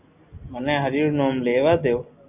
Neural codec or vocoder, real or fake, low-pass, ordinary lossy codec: none; real; 3.6 kHz; AAC, 24 kbps